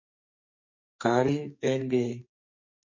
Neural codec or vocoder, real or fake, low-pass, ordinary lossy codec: codec, 44.1 kHz, 2.6 kbps, SNAC; fake; 7.2 kHz; MP3, 32 kbps